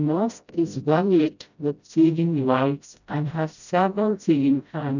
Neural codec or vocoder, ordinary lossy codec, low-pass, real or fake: codec, 16 kHz, 0.5 kbps, FreqCodec, smaller model; none; 7.2 kHz; fake